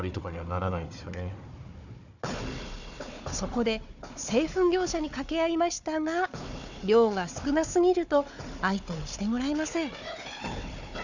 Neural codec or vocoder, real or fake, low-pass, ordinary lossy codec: codec, 16 kHz, 4 kbps, FunCodec, trained on Chinese and English, 50 frames a second; fake; 7.2 kHz; none